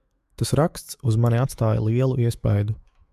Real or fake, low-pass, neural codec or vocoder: fake; 14.4 kHz; autoencoder, 48 kHz, 128 numbers a frame, DAC-VAE, trained on Japanese speech